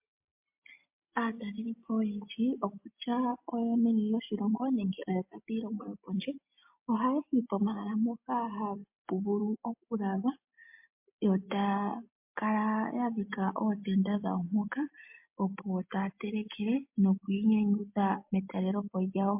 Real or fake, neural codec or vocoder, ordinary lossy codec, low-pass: real; none; MP3, 32 kbps; 3.6 kHz